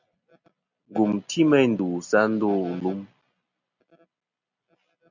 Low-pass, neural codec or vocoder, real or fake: 7.2 kHz; none; real